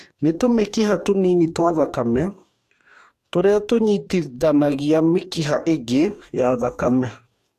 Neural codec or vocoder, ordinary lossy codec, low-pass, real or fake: codec, 44.1 kHz, 2.6 kbps, DAC; AAC, 64 kbps; 14.4 kHz; fake